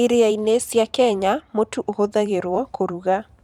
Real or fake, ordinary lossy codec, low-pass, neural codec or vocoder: fake; none; 19.8 kHz; vocoder, 44.1 kHz, 128 mel bands every 512 samples, BigVGAN v2